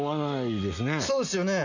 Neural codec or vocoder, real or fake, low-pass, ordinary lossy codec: none; real; 7.2 kHz; none